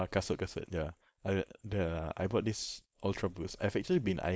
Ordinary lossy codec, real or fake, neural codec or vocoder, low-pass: none; fake; codec, 16 kHz, 4.8 kbps, FACodec; none